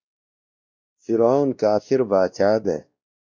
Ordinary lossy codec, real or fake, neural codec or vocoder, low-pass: MP3, 64 kbps; fake; codec, 16 kHz, 1 kbps, X-Codec, WavLM features, trained on Multilingual LibriSpeech; 7.2 kHz